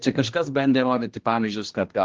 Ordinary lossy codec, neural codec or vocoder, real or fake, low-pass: Opus, 16 kbps; codec, 16 kHz, 1 kbps, X-Codec, HuBERT features, trained on general audio; fake; 7.2 kHz